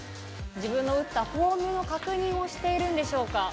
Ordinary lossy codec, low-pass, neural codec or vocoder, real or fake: none; none; none; real